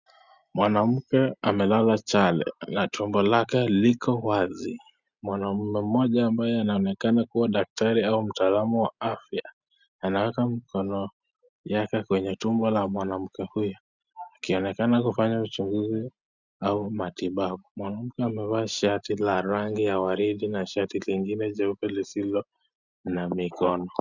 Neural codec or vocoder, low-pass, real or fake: none; 7.2 kHz; real